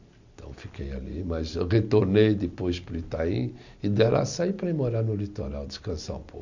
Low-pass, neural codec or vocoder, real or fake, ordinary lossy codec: 7.2 kHz; none; real; none